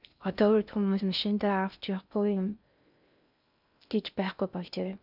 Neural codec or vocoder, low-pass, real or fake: codec, 16 kHz in and 24 kHz out, 0.6 kbps, FocalCodec, streaming, 4096 codes; 5.4 kHz; fake